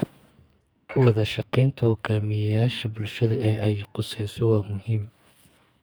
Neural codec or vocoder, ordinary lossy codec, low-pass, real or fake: codec, 44.1 kHz, 2.6 kbps, SNAC; none; none; fake